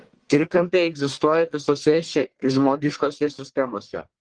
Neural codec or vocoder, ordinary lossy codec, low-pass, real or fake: codec, 44.1 kHz, 1.7 kbps, Pupu-Codec; Opus, 16 kbps; 9.9 kHz; fake